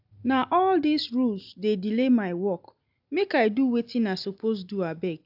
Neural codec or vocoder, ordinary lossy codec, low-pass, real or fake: none; AAC, 48 kbps; 5.4 kHz; real